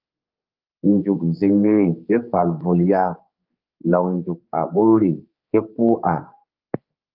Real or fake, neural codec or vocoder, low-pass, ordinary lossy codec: fake; codec, 16 kHz, 4 kbps, X-Codec, HuBERT features, trained on general audio; 5.4 kHz; Opus, 32 kbps